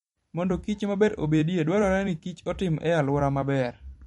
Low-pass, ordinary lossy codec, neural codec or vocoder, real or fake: 19.8 kHz; MP3, 48 kbps; vocoder, 44.1 kHz, 128 mel bands every 256 samples, BigVGAN v2; fake